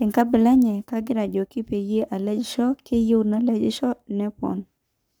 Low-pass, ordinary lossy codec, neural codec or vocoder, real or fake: none; none; codec, 44.1 kHz, 7.8 kbps, Pupu-Codec; fake